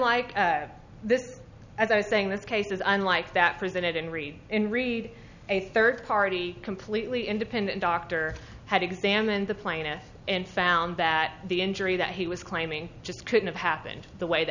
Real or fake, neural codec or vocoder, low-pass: real; none; 7.2 kHz